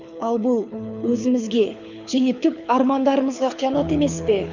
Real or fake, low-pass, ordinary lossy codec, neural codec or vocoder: fake; 7.2 kHz; none; codec, 24 kHz, 6 kbps, HILCodec